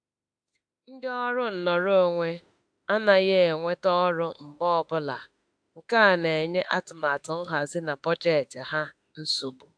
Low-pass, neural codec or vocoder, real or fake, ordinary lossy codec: 9.9 kHz; autoencoder, 48 kHz, 32 numbers a frame, DAC-VAE, trained on Japanese speech; fake; AAC, 64 kbps